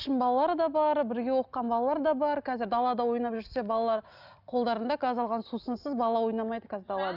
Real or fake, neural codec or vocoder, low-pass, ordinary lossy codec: real; none; 5.4 kHz; none